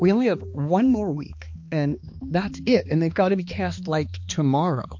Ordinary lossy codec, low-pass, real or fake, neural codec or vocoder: MP3, 48 kbps; 7.2 kHz; fake; codec, 16 kHz, 2 kbps, X-Codec, HuBERT features, trained on balanced general audio